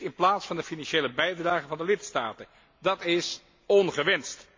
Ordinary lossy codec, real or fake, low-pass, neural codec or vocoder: MP3, 48 kbps; real; 7.2 kHz; none